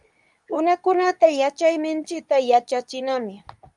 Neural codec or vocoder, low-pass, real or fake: codec, 24 kHz, 0.9 kbps, WavTokenizer, medium speech release version 2; 10.8 kHz; fake